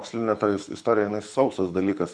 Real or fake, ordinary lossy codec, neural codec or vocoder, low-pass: fake; MP3, 64 kbps; codec, 24 kHz, 6 kbps, HILCodec; 9.9 kHz